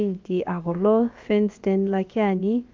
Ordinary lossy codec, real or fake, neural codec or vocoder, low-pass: Opus, 32 kbps; fake; codec, 16 kHz, about 1 kbps, DyCAST, with the encoder's durations; 7.2 kHz